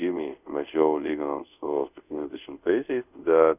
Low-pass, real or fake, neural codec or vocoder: 3.6 kHz; fake; codec, 24 kHz, 0.5 kbps, DualCodec